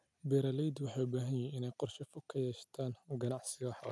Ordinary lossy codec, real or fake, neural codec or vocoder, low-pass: none; real; none; none